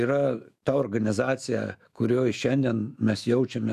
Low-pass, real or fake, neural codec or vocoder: 14.4 kHz; fake; autoencoder, 48 kHz, 128 numbers a frame, DAC-VAE, trained on Japanese speech